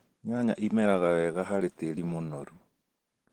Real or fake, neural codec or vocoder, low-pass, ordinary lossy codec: fake; vocoder, 44.1 kHz, 128 mel bands every 512 samples, BigVGAN v2; 19.8 kHz; Opus, 16 kbps